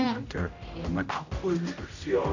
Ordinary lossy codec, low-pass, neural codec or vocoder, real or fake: none; 7.2 kHz; codec, 16 kHz, 0.5 kbps, X-Codec, HuBERT features, trained on general audio; fake